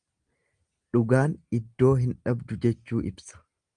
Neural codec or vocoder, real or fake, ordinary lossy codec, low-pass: none; real; Opus, 32 kbps; 9.9 kHz